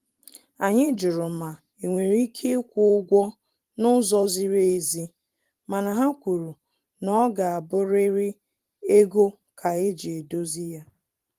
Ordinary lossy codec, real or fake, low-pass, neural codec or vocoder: Opus, 24 kbps; fake; 14.4 kHz; vocoder, 44.1 kHz, 128 mel bands every 512 samples, BigVGAN v2